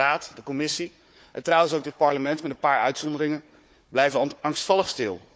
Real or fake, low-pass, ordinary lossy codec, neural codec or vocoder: fake; none; none; codec, 16 kHz, 4 kbps, FunCodec, trained on Chinese and English, 50 frames a second